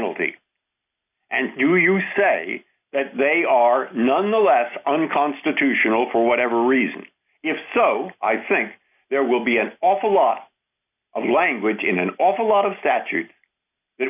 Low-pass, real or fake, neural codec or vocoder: 3.6 kHz; real; none